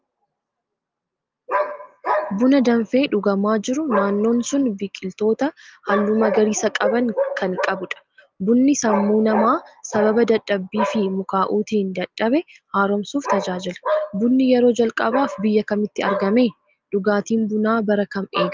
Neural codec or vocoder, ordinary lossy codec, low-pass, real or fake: none; Opus, 32 kbps; 7.2 kHz; real